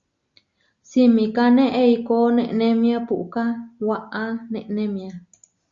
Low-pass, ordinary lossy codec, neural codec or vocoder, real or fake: 7.2 kHz; Opus, 64 kbps; none; real